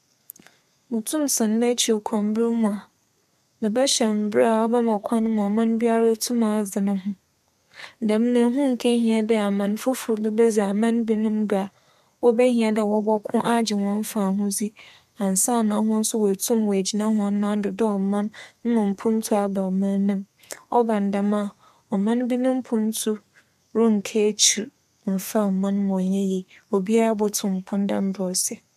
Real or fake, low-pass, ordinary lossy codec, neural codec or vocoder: fake; 14.4 kHz; MP3, 96 kbps; codec, 32 kHz, 1.9 kbps, SNAC